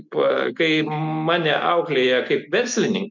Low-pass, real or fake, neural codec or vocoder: 7.2 kHz; real; none